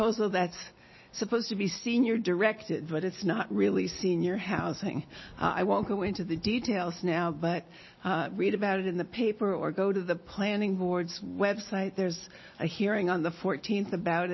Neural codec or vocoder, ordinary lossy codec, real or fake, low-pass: none; MP3, 24 kbps; real; 7.2 kHz